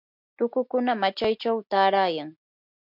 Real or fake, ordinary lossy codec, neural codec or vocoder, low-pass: real; MP3, 48 kbps; none; 5.4 kHz